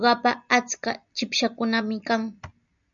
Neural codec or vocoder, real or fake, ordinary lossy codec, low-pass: none; real; MP3, 96 kbps; 7.2 kHz